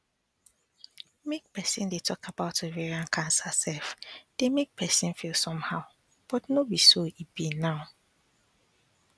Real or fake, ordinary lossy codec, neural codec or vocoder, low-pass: real; none; none; none